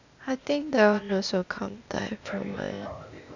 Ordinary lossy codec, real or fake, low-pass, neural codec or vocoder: none; fake; 7.2 kHz; codec, 16 kHz, 0.8 kbps, ZipCodec